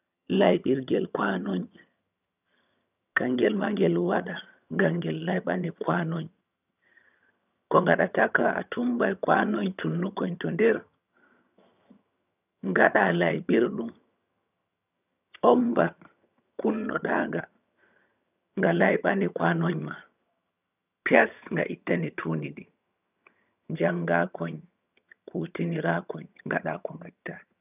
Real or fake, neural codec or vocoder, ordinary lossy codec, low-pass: fake; vocoder, 22.05 kHz, 80 mel bands, HiFi-GAN; none; 3.6 kHz